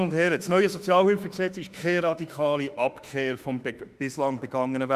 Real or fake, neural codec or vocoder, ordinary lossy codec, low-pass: fake; autoencoder, 48 kHz, 32 numbers a frame, DAC-VAE, trained on Japanese speech; Opus, 64 kbps; 14.4 kHz